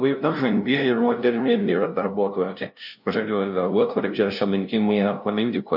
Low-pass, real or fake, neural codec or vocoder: 5.4 kHz; fake; codec, 16 kHz, 0.5 kbps, FunCodec, trained on LibriTTS, 25 frames a second